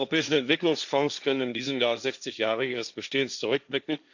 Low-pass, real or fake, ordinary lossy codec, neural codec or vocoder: 7.2 kHz; fake; none; codec, 16 kHz, 1.1 kbps, Voila-Tokenizer